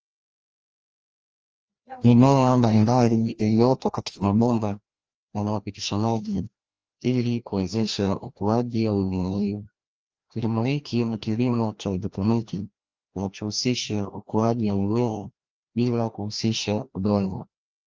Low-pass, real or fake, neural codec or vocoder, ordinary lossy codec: 7.2 kHz; fake; codec, 16 kHz, 1 kbps, FreqCodec, larger model; Opus, 24 kbps